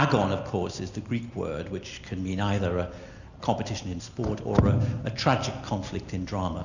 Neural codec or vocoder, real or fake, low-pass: none; real; 7.2 kHz